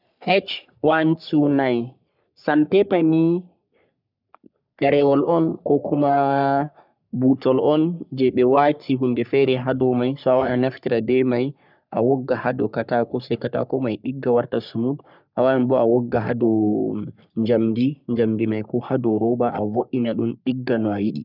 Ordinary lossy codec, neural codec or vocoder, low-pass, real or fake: none; codec, 44.1 kHz, 3.4 kbps, Pupu-Codec; 5.4 kHz; fake